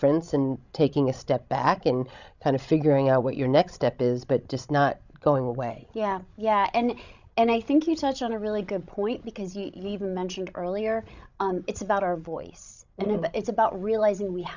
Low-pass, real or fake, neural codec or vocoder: 7.2 kHz; fake; codec, 16 kHz, 16 kbps, FreqCodec, larger model